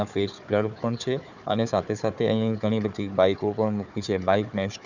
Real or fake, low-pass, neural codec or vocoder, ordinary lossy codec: fake; 7.2 kHz; codec, 16 kHz, 4 kbps, FunCodec, trained on Chinese and English, 50 frames a second; none